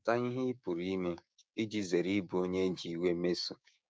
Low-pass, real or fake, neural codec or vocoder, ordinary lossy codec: none; fake; codec, 16 kHz, 6 kbps, DAC; none